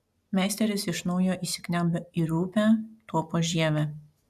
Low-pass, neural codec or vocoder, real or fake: 14.4 kHz; none; real